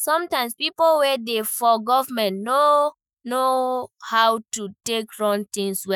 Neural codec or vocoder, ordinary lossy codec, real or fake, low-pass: autoencoder, 48 kHz, 128 numbers a frame, DAC-VAE, trained on Japanese speech; none; fake; none